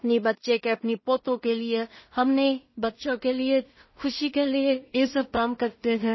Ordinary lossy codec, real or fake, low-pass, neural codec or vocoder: MP3, 24 kbps; fake; 7.2 kHz; codec, 16 kHz in and 24 kHz out, 0.4 kbps, LongCat-Audio-Codec, two codebook decoder